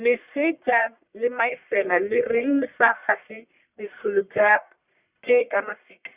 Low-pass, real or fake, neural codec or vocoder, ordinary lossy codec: 3.6 kHz; fake; codec, 44.1 kHz, 1.7 kbps, Pupu-Codec; Opus, 64 kbps